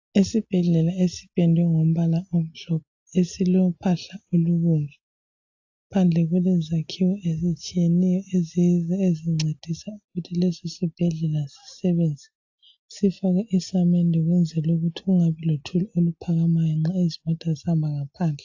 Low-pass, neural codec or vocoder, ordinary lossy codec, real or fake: 7.2 kHz; none; AAC, 48 kbps; real